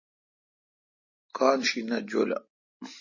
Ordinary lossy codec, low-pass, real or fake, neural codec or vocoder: MP3, 32 kbps; 7.2 kHz; real; none